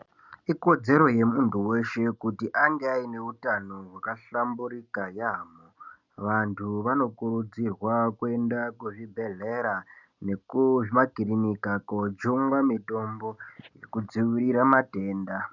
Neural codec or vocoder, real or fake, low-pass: none; real; 7.2 kHz